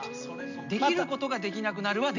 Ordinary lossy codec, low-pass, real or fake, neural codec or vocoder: none; 7.2 kHz; real; none